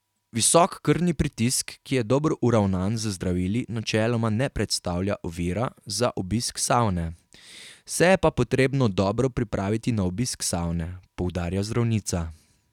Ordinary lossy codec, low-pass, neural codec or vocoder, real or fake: none; 19.8 kHz; none; real